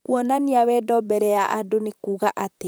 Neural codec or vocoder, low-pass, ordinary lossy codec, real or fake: vocoder, 44.1 kHz, 128 mel bands, Pupu-Vocoder; none; none; fake